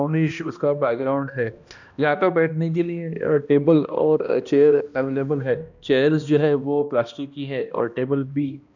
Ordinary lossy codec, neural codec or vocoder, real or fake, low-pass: none; codec, 16 kHz, 1 kbps, X-Codec, HuBERT features, trained on balanced general audio; fake; 7.2 kHz